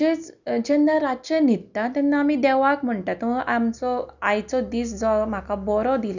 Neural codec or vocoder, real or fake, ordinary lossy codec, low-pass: none; real; none; 7.2 kHz